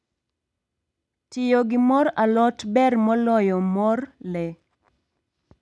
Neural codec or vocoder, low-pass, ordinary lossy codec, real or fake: none; none; none; real